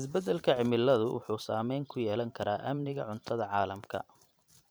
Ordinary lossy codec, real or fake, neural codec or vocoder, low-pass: none; fake; vocoder, 44.1 kHz, 128 mel bands every 512 samples, BigVGAN v2; none